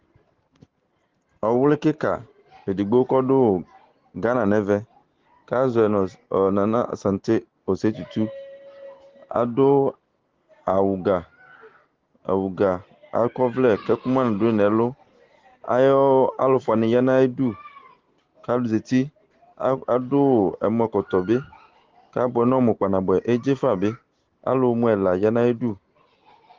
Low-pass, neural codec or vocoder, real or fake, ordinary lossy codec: 7.2 kHz; none; real; Opus, 16 kbps